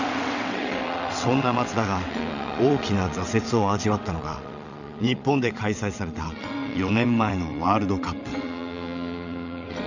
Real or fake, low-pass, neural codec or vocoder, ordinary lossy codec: fake; 7.2 kHz; vocoder, 22.05 kHz, 80 mel bands, WaveNeXt; none